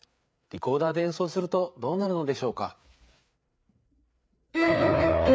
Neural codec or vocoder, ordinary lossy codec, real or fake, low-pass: codec, 16 kHz, 4 kbps, FreqCodec, larger model; none; fake; none